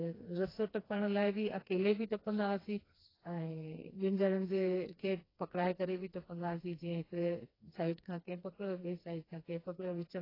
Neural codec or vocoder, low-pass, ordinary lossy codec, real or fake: codec, 16 kHz, 2 kbps, FreqCodec, smaller model; 5.4 kHz; AAC, 24 kbps; fake